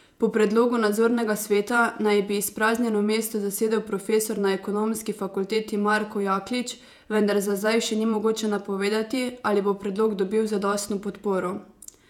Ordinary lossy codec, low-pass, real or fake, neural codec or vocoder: none; 19.8 kHz; fake; vocoder, 48 kHz, 128 mel bands, Vocos